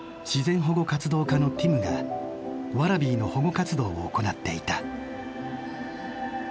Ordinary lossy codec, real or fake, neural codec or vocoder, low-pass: none; real; none; none